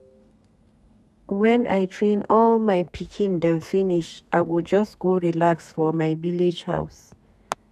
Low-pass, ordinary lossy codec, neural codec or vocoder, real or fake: 14.4 kHz; none; codec, 32 kHz, 1.9 kbps, SNAC; fake